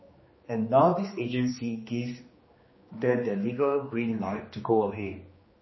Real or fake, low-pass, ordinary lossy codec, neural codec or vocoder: fake; 7.2 kHz; MP3, 24 kbps; codec, 16 kHz, 2 kbps, X-Codec, HuBERT features, trained on general audio